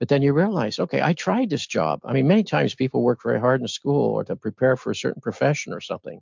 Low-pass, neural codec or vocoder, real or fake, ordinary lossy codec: 7.2 kHz; none; real; MP3, 64 kbps